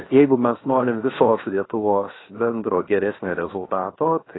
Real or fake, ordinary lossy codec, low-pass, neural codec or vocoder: fake; AAC, 16 kbps; 7.2 kHz; codec, 16 kHz, 0.7 kbps, FocalCodec